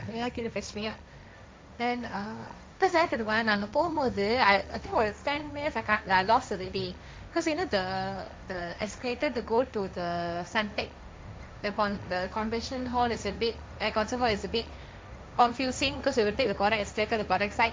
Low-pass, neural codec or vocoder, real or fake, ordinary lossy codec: none; codec, 16 kHz, 1.1 kbps, Voila-Tokenizer; fake; none